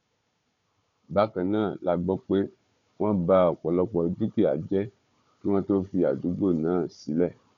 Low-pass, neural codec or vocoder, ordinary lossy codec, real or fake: 7.2 kHz; codec, 16 kHz, 4 kbps, FunCodec, trained on Chinese and English, 50 frames a second; none; fake